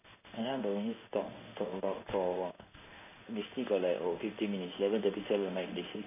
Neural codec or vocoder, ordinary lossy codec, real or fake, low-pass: codec, 16 kHz in and 24 kHz out, 1 kbps, XY-Tokenizer; none; fake; 3.6 kHz